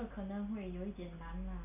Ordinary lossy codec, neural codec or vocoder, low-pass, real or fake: none; none; 3.6 kHz; real